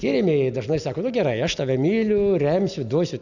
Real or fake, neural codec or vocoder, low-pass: real; none; 7.2 kHz